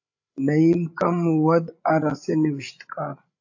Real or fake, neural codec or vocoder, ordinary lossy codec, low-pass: fake; codec, 16 kHz, 8 kbps, FreqCodec, larger model; AAC, 48 kbps; 7.2 kHz